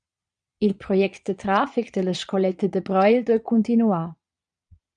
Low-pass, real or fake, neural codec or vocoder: 9.9 kHz; fake; vocoder, 22.05 kHz, 80 mel bands, Vocos